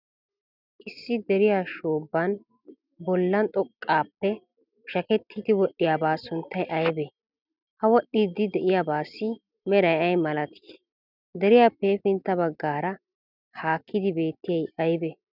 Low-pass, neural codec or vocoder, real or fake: 5.4 kHz; none; real